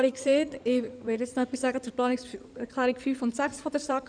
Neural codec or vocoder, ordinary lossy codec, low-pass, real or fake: codec, 16 kHz in and 24 kHz out, 2.2 kbps, FireRedTTS-2 codec; none; 9.9 kHz; fake